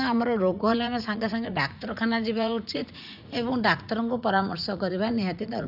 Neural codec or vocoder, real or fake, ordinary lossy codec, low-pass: vocoder, 44.1 kHz, 80 mel bands, Vocos; fake; none; 5.4 kHz